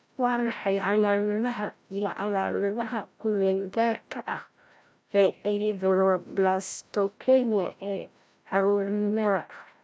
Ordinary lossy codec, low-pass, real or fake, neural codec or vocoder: none; none; fake; codec, 16 kHz, 0.5 kbps, FreqCodec, larger model